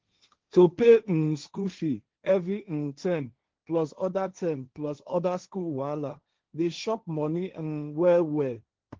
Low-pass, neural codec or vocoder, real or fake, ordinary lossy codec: 7.2 kHz; codec, 16 kHz, 1.1 kbps, Voila-Tokenizer; fake; Opus, 16 kbps